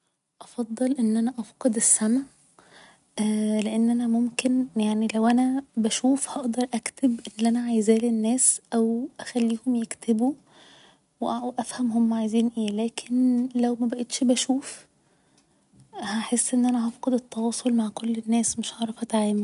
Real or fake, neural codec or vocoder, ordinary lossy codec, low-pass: real; none; none; 10.8 kHz